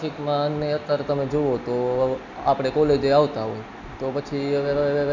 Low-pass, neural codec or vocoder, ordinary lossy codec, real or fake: 7.2 kHz; none; none; real